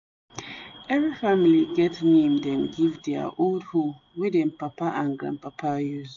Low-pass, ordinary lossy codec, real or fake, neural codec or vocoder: 7.2 kHz; MP3, 48 kbps; real; none